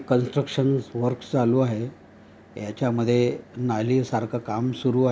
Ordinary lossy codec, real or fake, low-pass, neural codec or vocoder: none; real; none; none